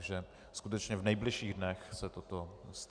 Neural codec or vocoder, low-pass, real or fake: none; 9.9 kHz; real